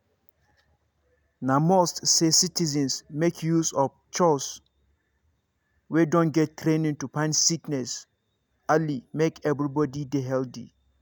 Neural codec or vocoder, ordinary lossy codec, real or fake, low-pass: none; none; real; none